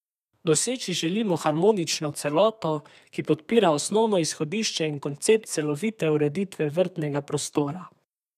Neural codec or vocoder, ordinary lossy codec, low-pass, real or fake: codec, 32 kHz, 1.9 kbps, SNAC; none; 14.4 kHz; fake